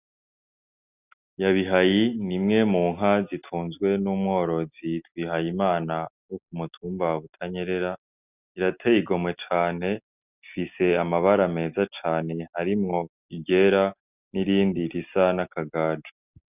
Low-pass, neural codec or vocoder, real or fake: 3.6 kHz; none; real